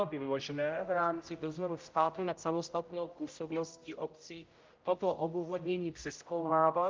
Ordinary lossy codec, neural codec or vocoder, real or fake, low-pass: Opus, 24 kbps; codec, 16 kHz, 0.5 kbps, X-Codec, HuBERT features, trained on general audio; fake; 7.2 kHz